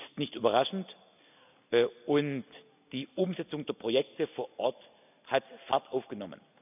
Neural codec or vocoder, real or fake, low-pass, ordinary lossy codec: none; real; 3.6 kHz; none